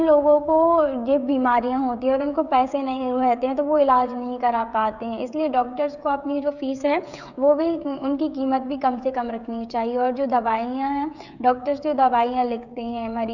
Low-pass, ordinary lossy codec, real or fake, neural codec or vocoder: 7.2 kHz; none; fake; codec, 16 kHz, 16 kbps, FreqCodec, smaller model